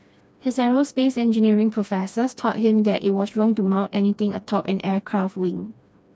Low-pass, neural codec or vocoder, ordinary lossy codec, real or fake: none; codec, 16 kHz, 2 kbps, FreqCodec, smaller model; none; fake